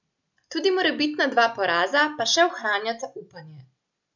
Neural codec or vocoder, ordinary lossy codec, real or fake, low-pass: none; none; real; 7.2 kHz